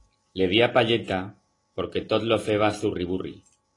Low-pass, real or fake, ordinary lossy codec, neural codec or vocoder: 10.8 kHz; real; AAC, 32 kbps; none